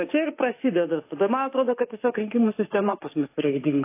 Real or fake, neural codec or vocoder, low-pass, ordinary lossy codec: fake; autoencoder, 48 kHz, 32 numbers a frame, DAC-VAE, trained on Japanese speech; 3.6 kHz; AAC, 24 kbps